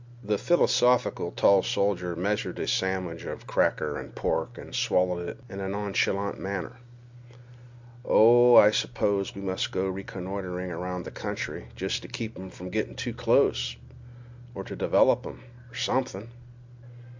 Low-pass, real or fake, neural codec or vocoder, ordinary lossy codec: 7.2 kHz; real; none; MP3, 64 kbps